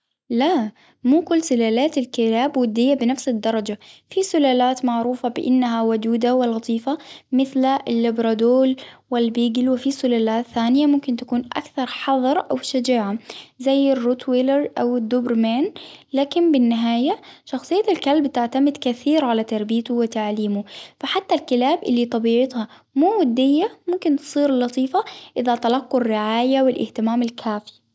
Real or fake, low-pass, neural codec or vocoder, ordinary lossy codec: real; none; none; none